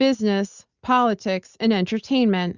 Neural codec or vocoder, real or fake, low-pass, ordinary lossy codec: none; real; 7.2 kHz; Opus, 64 kbps